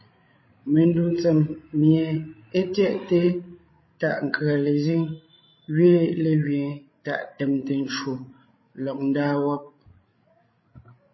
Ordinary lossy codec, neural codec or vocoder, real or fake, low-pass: MP3, 24 kbps; codec, 16 kHz, 16 kbps, FreqCodec, larger model; fake; 7.2 kHz